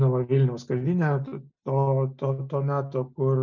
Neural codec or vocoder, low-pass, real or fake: none; 7.2 kHz; real